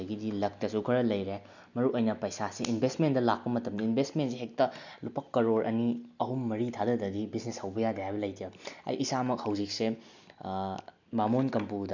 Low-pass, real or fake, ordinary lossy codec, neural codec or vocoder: 7.2 kHz; real; none; none